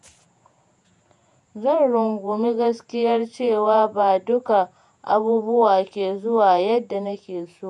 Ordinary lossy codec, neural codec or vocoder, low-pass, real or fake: none; vocoder, 48 kHz, 128 mel bands, Vocos; 10.8 kHz; fake